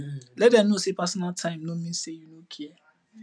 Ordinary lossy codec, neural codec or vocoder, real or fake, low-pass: none; none; real; 9.9 kHz